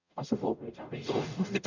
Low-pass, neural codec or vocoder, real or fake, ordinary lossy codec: 7.2 kHz; codec, 44.1 kHz, 0.9 kbps, DAC; fake; none